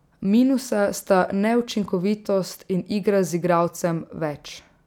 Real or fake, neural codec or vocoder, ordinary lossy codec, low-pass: real; none; none; 19.8 kHz